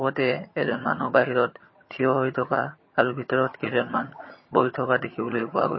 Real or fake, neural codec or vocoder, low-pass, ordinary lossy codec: fake; vocoder, 22.05 kHz, 80 mel bands, HiFi-GAN; 7.2 kHz; MP3, 24 kbps